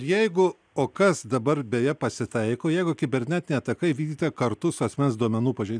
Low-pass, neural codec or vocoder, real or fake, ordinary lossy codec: 9.9 kHz; none; real; MP3, 96 kbps